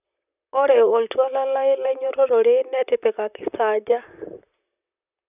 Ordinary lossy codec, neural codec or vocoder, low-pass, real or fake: none; vocoder, 44.1 kHz, 128 mel bands, Pupu-Vocoder; 3.6 kHz; fake